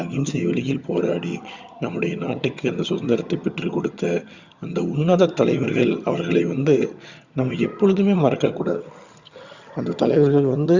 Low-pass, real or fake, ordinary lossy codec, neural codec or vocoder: 7.2 kHz; fake; Opus, 64 kbps; vocoder, 22.05 kHz, 80 mel bands, HiFi-GAN